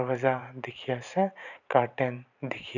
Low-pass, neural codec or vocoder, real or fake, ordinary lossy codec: 7.2 kHz; vocoder, 44.1 kHz, 128 mel bands every 512 samples, BigVGAN v2; fake; none